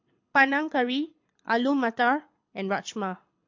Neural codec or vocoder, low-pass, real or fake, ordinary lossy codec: codec, 24 kHz, 6 kbps, HILCodec; 7.2 kHz; fake; MP3, 48 kbps